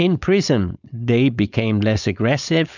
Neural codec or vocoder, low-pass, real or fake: codec, 16 kHz, 4.8 kbps, FACodec; 7.2 kHz; fake